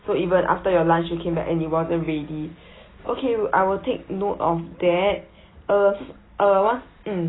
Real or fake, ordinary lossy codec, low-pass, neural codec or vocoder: real; AAC, 16 kbps; 7.2 kHz; none